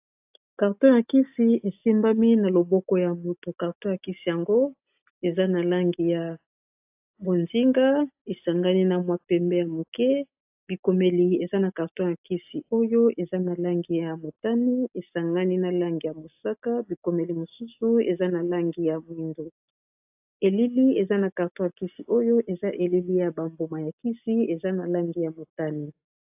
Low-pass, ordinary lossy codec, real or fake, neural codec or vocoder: 3.6 kHz; AAC, 32 kbps; real; none